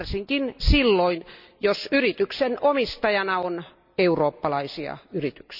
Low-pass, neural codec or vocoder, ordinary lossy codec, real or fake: 5.4 kHz; none; none; real